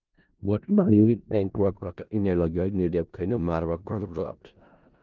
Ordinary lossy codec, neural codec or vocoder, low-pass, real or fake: Opus, 24 kbps; codec, 16 kHz in and 24 kHz out, 0.4 kbps, LongCat-Audio-Codec, four codebook decoder; 7.2 kHz; fake